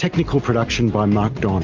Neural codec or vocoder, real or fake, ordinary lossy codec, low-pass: none; real; Opus, 32 kbps; 7.2 kHz